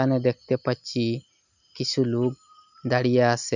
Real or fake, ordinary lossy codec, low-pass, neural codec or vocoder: real; none; 7.2 kHz; none